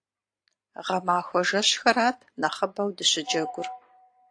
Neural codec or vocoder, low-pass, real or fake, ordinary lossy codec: vocoder, 44.1 kHz, 128 mel bands every 512 samples, BigVGAN v2; 9.9 kHz; fake; AAC, 48 kbps